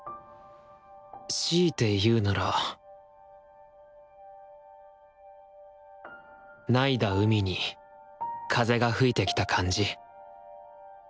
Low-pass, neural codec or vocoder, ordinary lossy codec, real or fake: none; none; none; real